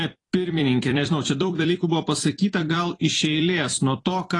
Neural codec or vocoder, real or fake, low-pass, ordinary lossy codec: vocoder, 44.1 kHz, 128 mel bands every 256 samples, BigVGAN v2; fake; 10.8 kHz; AAC, 32 kbps